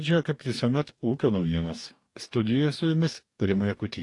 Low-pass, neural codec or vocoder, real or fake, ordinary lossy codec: 10.8 kHz; codec, 44.1 kHz, 2.6 kbps, DAC; fake; AAC, 48 kbps